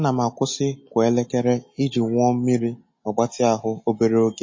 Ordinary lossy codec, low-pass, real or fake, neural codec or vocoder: MP3, 32 kbps; 7.2 kHz; real; none